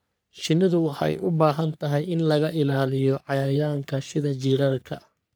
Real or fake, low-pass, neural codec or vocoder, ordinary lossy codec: fake; none; codec, 44.1 kHz, 3.4 kbps, Pupu-Codec; none